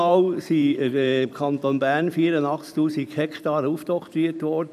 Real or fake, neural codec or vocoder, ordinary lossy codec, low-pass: fake; vocoder, 44.1 kHz, 128 mel bands every 512 samples, BigVGAN v2; none; 14.4 kHz